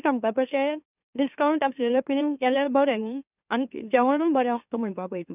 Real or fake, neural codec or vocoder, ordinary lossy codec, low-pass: fake; autoencoder, 44.1 kHz, a latent of 192 numbers a frame, MeloTTS; none; 3.6 kHz